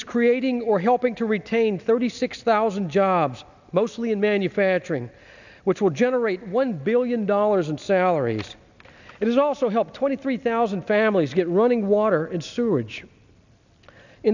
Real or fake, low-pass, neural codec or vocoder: real; 7.2 kHz; none